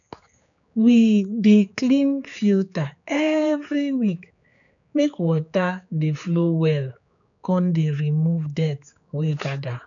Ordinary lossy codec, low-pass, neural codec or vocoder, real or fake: none; 7.2 kHz; codec, 16 kHz, 4 kbps, X-Codec, HuBERT features, trained on general audio; fake